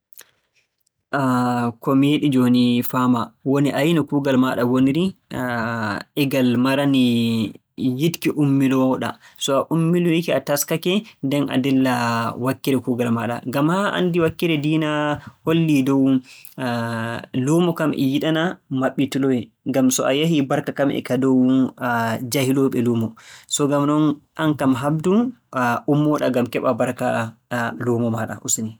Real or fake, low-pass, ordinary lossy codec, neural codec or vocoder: real; none; none; none